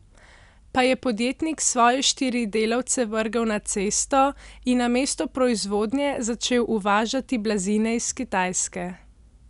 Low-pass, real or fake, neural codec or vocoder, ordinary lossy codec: 10.8 kHz; real; none; none